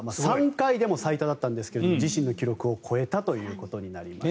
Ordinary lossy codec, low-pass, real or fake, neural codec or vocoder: none; none; real; none